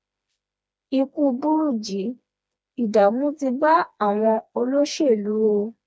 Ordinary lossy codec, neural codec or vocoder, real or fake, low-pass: none; codec, 16 kHz, 2 kbps, FreqCodec, smaller model; fake; none